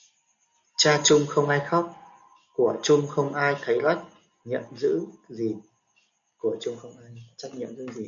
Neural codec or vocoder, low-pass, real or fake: none; 7.2 kHz; real